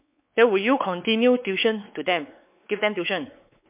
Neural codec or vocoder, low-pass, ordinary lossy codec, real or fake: codec, 16 kHz, 4 kbps, X-Codec, WavLM features, trained on Multilingual LibriSpeech; 3.6 kHz; MP3, 32 kbps; fake